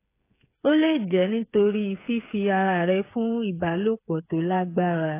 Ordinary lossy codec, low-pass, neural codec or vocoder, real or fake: AAC, 24 kbps; 3.6 kHz; codec, 16 kHz, 8 kbps, FreqCodec, smaller model; fake